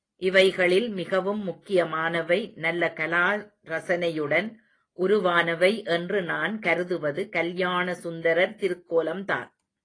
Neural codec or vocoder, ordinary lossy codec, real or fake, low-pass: none; AAC, 32 kbps; real; 9.9 kHz